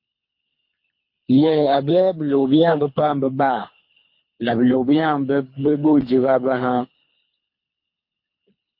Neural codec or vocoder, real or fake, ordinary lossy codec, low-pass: codec, 24 kHz, 3 kbps, HILCodec; fake; MP3, 32 kbps; 5.4 kHz